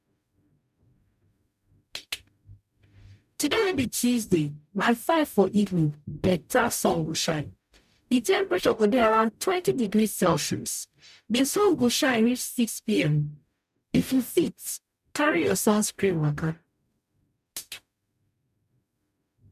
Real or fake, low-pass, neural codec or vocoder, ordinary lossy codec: fake; 14.4 kHz; codec, 44.1 kHz, 0.9 kbps, DAC; none